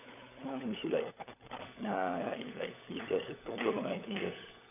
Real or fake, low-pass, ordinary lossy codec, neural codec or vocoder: fake; 3.6 kHz; none; codec, 16 kHz, 16 kbps, FunCodec, trained on LibriTTS, 50 frames a second